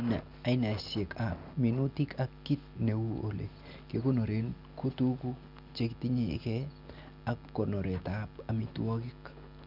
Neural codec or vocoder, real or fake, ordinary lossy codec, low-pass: none; real; none; 5.4 kHz